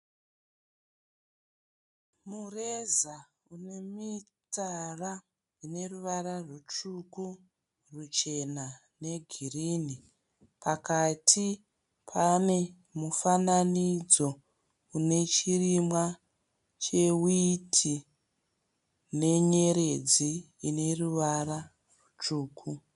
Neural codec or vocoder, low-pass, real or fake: none; 10.8 kHz; real